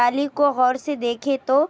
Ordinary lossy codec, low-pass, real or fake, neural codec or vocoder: none; none; real; none